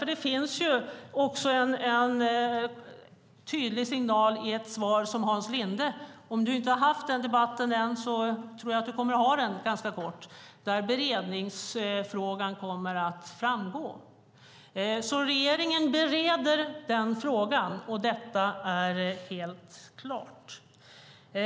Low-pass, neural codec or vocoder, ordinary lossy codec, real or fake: none; none; none; real